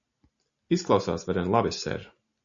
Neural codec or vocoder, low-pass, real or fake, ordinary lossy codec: none; 7.2 kHz; real; AAC, 64 kbps